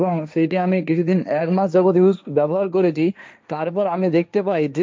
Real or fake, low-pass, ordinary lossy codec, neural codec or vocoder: fake; none; none; codec, 16 kHz, 1.1 kbps, Voila-Tokenizer